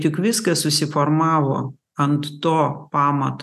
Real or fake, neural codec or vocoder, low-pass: real; none; 14.4 kHz